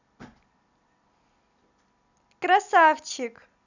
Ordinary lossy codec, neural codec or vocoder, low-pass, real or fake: none; none; 7.2 kHz; real